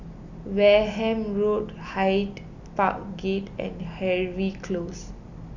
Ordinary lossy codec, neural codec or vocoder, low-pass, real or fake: none; none; 7.2 kHz; real